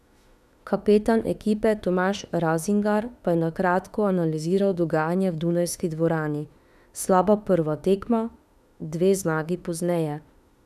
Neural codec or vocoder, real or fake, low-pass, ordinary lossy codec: autoencoder, 48 kHz, 32 numbers a frame, DAC-VAE, trained on Japanese speech; fake; 14.4 kHz; none